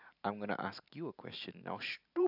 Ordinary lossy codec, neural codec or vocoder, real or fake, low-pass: none; vocoder, 44.1 kHz, 128 mel bands every 512 samples, BigVGAN v2; fake; 5.4 kHz